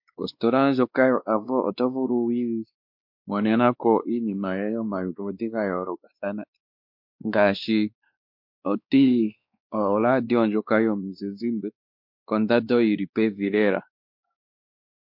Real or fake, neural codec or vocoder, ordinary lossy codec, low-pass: fake; codec, 16 kHz, 2 kbps, X-Codec, WavLM features, trained on Multilingual LibriSpeech; MP3, 48 kbps; 5.4 kHz